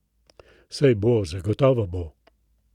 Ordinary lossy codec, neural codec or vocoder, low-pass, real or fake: none; none; 19.8 kHz; real